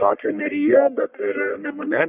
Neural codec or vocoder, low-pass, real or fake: codec, 44.1 kHz, 1.7 kbps, Pupu-Codec; 3.6 kHz; fake